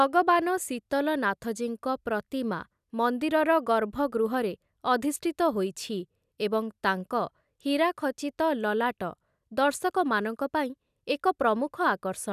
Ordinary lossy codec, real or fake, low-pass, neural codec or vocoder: none; real; 14.4 kHz; none